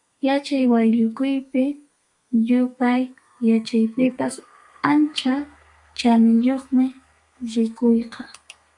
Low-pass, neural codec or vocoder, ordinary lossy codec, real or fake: 10.8 kHz; codec, 32 kHz, 1.9 kbps, SNAC; MP3, 96 kbps; fake